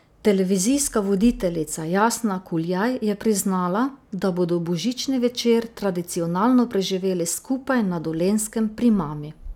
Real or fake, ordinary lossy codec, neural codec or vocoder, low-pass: real; none; none; 19.8 kHz